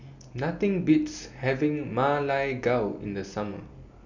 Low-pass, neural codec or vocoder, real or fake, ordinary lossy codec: 7.2 kHz; none; real; none